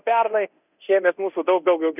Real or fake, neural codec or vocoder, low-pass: fake; codec, 24 kHz, 0.9 kbps, DualCodec; 3.6 kHz